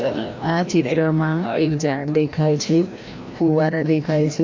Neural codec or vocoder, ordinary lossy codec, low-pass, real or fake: codec, 16 kHz, 1 kbps, FreqCodec, larger model; MP3, 64 kbps; 7.2 kHz; fake